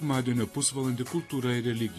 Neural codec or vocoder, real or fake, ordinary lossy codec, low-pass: none; real; AAC, 48 kbps; 14.4 kHz